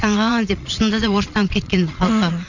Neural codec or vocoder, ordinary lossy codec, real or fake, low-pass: vocoder, 22.05 kHz, 80 mel bands, Vocos; none; fake; 7.2 kHz